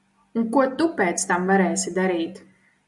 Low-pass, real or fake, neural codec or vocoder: 10.8 kHz; real; none